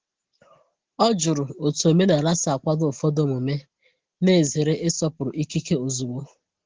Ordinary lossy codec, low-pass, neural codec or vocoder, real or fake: Opus, 16 kbps; 7.2 kHz; none; real